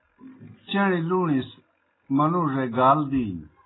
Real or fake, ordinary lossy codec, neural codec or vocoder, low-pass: real; AAC, 16 kbps; none; 7.2 kHz